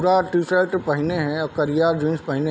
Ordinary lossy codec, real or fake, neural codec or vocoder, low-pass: none; real; none; none